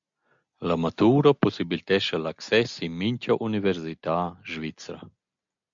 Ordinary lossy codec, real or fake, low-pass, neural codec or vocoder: MP3, 64 kbps; real; 7.2 kHz; none